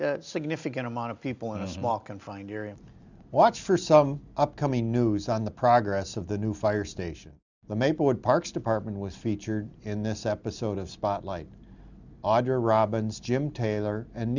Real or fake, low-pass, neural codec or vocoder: real; 7.2 kHz; none